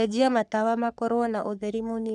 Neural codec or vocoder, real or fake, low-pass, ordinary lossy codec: codec, 44.1 kHz, 3.4 kbps, Pupu-Codec; fake; 10.8 kHz; none